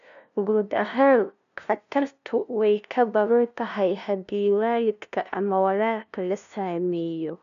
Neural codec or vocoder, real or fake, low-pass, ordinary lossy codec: codec, 16 kHz, 0.5 kbps, FunCodec, trained on LibriTTS, 25 frames a second; fake; 7.2 kHz; none